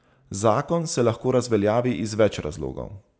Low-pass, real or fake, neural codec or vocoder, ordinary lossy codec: none; real; none; none